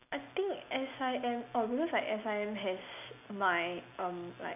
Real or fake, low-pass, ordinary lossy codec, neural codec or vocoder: real; 3.6 kHz; none; none